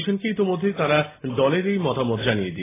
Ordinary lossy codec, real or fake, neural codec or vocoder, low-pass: AAC, 16 kbps; real; none; 3.6 kHz